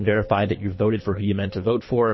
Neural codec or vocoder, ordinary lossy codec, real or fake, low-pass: codec, 24 kHz, 3 kbps, HILCodec; MP3, 24 kbps; fake; 7.2 kHz